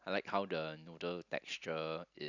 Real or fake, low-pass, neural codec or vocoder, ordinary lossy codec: real; 7.2 kHz; none; none